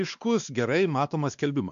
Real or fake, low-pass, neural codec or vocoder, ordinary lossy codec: fake; 7.2 kHz; codec, 16 kHz, 2 kbps, X-Codec, WavLM features, trained on Multilingual LibriSpeech; MP3, 96 kbps